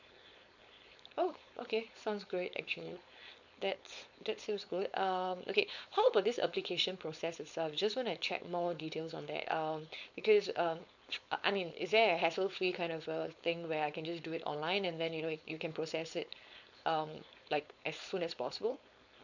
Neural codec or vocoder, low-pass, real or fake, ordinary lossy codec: codec, 16 kHz, 4.8 kbps, FACodec; 7.2 kHz; fake; none